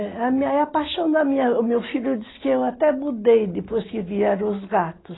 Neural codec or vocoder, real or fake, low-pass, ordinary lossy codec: none; real; 7.2 kHz; AAC, 16 kbps